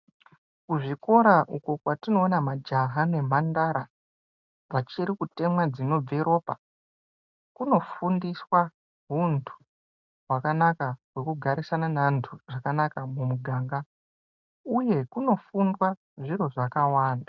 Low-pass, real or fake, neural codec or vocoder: 7.2 kHz; real; none